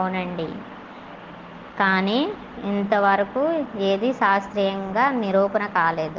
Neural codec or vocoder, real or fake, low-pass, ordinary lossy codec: none; real; 7.2 kHz; Opus, 16 kbps